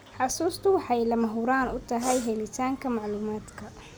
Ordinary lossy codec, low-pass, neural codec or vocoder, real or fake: none; none; none; real